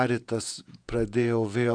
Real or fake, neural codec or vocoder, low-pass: fake; vocoder, 48 kHz, 128 mel bands, Vocos; 9.9 kHz